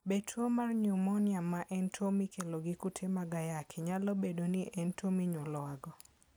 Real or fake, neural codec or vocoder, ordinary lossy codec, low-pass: real; none; none; none